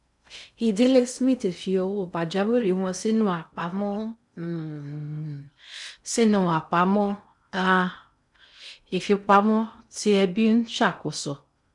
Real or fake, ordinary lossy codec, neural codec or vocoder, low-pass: fake; none; codec, 16 kHz in and 24 kHz out, 0.6 kbps, FocalCodec, streaming, 2048 codes; 10.8 kHz